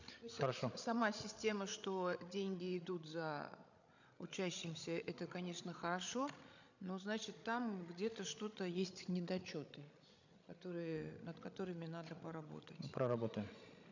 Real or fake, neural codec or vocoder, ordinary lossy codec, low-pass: fake; codec, 16 kHz, 16 kbps, FreqCodec, larger model; none; 7.2 kHz